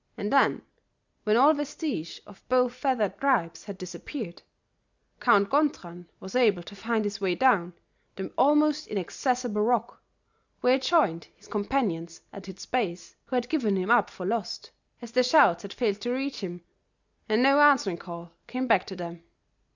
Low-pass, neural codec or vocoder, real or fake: 7.2 kHz; none; real